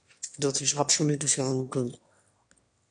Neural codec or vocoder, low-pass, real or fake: autoencoder, 22.05 kHz, a latent of 192 numbers a frame, VITS, trained on one speaker; 9.9 kHz; fake